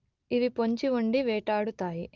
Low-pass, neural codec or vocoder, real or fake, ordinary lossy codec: 7.2 kHz; none; real; Opus, 32 kbps